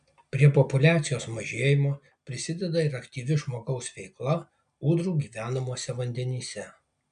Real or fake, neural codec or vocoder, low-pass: real; none; 9.9 kHz